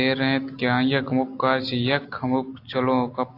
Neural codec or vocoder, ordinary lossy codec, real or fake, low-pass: none; AAC, 32 kbps; real; 5.4 kHz